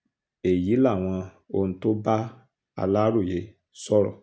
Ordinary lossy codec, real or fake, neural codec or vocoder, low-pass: none; real; none; none